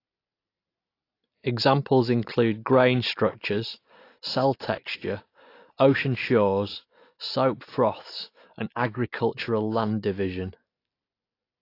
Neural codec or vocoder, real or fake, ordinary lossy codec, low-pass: none; real; AAC, 32 kbps; 5.4 kHz